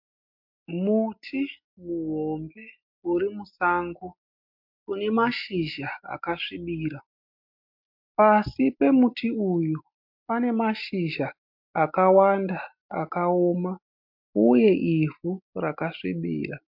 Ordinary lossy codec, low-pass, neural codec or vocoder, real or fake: MP3, 48 kbps; 5.4 kHz; none; real